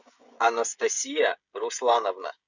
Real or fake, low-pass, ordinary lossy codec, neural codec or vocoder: fake; 7.2 kHz; Opus, 64 kbps; codec, 16 kHz, 16 kbps, FreqCodec, smaller model